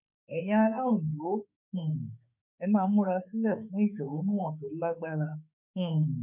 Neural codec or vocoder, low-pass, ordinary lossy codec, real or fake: autoencoder, 48 kHz, 32 numbers a frame, DAC-VAE, trained on Japanese speech; 3.6 kHz; none; fake